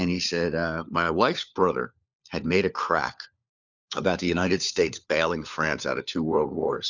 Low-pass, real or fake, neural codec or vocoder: 7.2 kHz; fake; codec, 16 kHz, 4 kbps, FunCodec, trained on LibriTTS, 50 frames a second